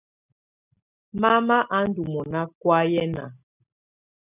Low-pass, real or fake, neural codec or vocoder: 3.6 kHz; real; none